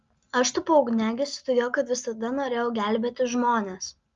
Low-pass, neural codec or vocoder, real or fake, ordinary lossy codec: 7.2 kHz; none; real; Opus, 32 kbps